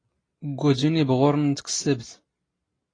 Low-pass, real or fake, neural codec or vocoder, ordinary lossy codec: 9.9 kHz; real; none; AAC, 32 kbps